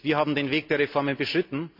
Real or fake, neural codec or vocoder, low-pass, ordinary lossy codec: real; none; 5.4 kHz; MP3, 48 kbps